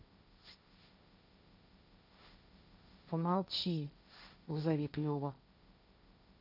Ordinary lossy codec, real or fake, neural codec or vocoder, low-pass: none; fake; codec, 16 kHz, 1.1 kbps, Voila-Tokenizer; 5.4 kHz